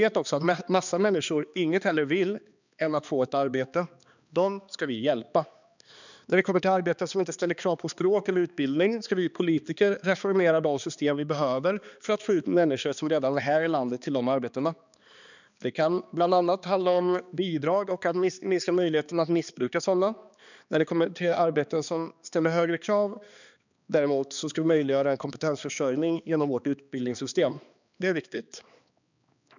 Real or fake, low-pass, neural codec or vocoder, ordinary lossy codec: fake; 7.2 kHz; codec, 16 kHz, 2 kbps, X-Codec, HuBERT features, trained on balanced general audio; none